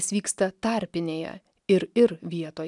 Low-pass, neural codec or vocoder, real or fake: 10.8 kHz; none; real